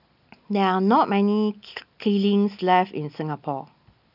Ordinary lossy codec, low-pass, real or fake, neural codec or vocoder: none; 5.4 kHz; real; none